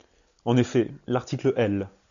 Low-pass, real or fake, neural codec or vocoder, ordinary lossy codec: 7.2 kHz; real; none; AAC, 96 kbps